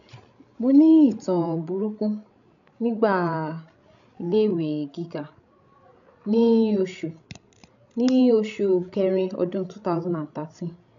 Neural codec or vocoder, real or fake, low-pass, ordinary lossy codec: codec, 16 kHz, 16 kbps, FreqCodec, larger model; fake; 7.2 kHz; MP3, 96 kbps